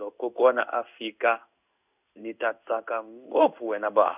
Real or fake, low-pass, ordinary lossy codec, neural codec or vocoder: fake; 3.6 kHz; none; codec, 16 kHz in and 24 kHz out, 1 kbps, XY-Tokenizer